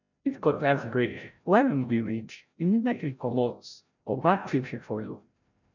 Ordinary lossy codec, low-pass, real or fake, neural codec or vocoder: none; 7.2 kHz; fake; codec, 16 kHz, 0.5 kbps, FreqCodec, larger model